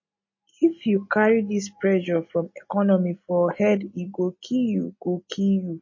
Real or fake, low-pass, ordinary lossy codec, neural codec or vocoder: real; 7.2 kHz; MP3, 32 kbps; none